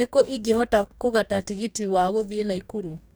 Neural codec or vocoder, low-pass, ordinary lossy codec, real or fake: codec, 44.1 kHz, 2.6 kbps, DAC; none; none; fake